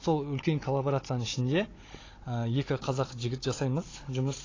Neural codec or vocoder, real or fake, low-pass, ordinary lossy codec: codec, 16 kHz, 16 kbps, FunCodec, trained on Chinese and English, 50 frames a second; fake; 7.2 kHz; AAC, 32 kbps